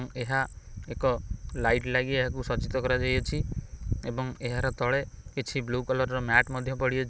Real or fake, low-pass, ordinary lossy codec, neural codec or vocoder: real; none; none; none